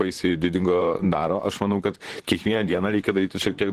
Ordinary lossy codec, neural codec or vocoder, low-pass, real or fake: Opus, 16 kbps; vocoder, 44.1 kHz, 128 mel bands, Pupu-Vocoder; 14.4 kHz; fake